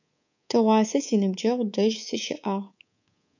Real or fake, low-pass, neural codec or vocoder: fake; 7.2 kHz; codec, 24 kHz, 3.1 kbps, DualCodec